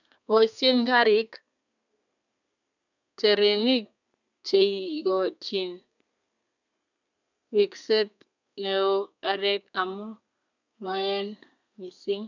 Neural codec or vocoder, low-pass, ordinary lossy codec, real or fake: codec, 32 kHz, 1.9 kbps, SNAC; 7.2 kHz; none; fake